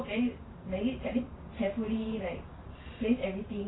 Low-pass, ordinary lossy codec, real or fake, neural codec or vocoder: 7.2 kHz; AAC, 16 kbps; fake; vocoder, 44.1 kHz, 128 mel bands every 512 samples, BigVGAN v2